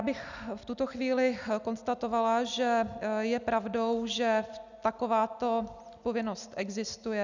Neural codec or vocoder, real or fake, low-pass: none; real; 7.2 kHz